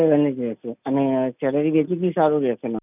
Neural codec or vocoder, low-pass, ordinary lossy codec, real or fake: none; 3.6 kHz; none; real